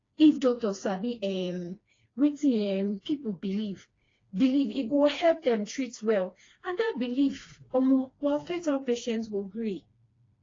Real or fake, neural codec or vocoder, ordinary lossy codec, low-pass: fake; codec, 16 kHz, 2 kbps, FreqCodec, smaller model; AAC, 32 kbps; 7.2 kHz